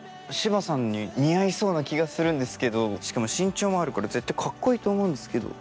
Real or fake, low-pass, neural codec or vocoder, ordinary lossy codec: real; none; none; none